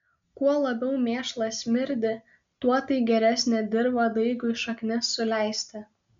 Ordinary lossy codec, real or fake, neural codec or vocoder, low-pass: MP3, 64 kbps; real; none; 7.2 kHz